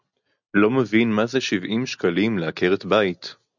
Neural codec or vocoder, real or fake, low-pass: none; real; 7.2 kHz